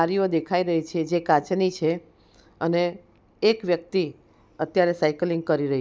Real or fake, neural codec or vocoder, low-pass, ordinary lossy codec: real; none; none; none